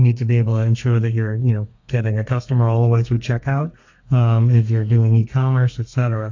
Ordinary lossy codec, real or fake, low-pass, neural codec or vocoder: AAC, 48 kbps; fake; 7.2 kHz; codec, 32 kHz, 1.9 kbps, SNAC